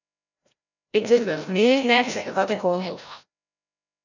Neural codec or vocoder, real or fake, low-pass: codec, 16 kHz, 0.5 kbps, FreqCodec, larger model; fake; 7.2 kHz